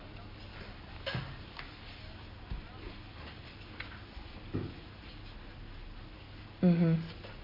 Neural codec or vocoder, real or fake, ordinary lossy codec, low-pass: none; real; MP3, 32 kbps; 5.4 kHz